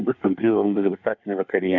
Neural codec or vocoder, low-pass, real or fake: codec, 24 kHz, 1 kbps, SNAC; 7.2 kHz; fake